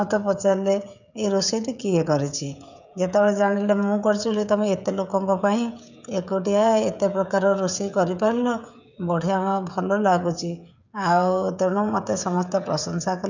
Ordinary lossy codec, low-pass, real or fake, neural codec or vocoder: none; 7.2 kHz; fake; codec, 16 kHz, 16 kbps, FreqCodec, smaller model